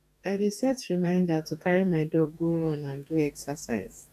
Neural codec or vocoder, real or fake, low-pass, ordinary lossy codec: codec, 44.1 kHz, 2.6 kbps, DAC; fake; 14.4 kHz; none